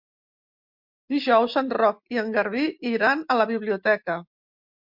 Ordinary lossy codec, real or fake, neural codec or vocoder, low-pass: MP3, 48 kbps; real; none; 5.4 kHz